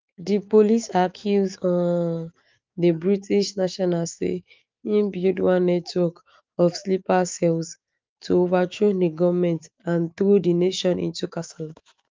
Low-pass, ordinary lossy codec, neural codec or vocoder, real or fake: 7.2 kHz; Opus, 24 kbps; autoencoder, 48 kHz, 128 numbers a frame, DAC-VAE, trained on Japanese speech; fake